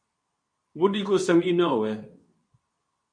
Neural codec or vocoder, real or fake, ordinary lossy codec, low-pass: codec, 24 kHz, 0.9 kbps, WavTokenizer, medium speech release version 2; fake; MP3, 48 kbps; 9.9 kHz